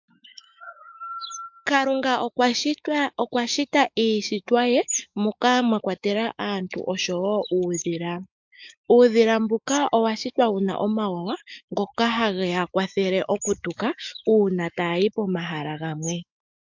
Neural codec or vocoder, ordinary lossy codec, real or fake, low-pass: autoencoder, 48 kHz, 128 numbers a frame, DAC-VAE, trained on Japanese speech; MP3, 64 kbps; fake; 7.2 kHz